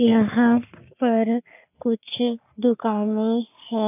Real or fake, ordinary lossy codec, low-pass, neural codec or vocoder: fake; none; 3.6 kHz; codec, 44.1 kHz, 3.4 kbps, Pupu-Codec